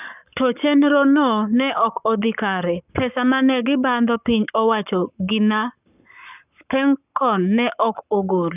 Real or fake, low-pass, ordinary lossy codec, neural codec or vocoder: fake; 3.6 kHz; none; codec, 44.1 kHz, 7.8 kbps, Pupu-Codec